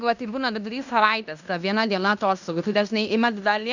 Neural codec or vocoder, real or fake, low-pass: codec, 16 kHz in and 24 kHz out, 0.9 kbps, LongCat-Audio-Codec, fine tuned four codebook decoder; fake; 7.2 kHz